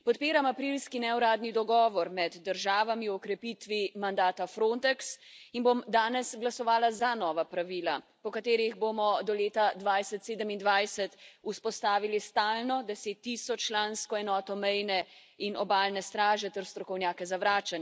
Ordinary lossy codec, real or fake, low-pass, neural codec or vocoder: none; real; none; none